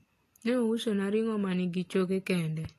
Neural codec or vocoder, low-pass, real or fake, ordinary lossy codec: none; 14.4 kHz; real; AAC, 48 kbps